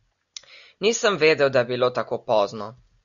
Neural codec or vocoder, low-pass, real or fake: none; 7.2 kHz; real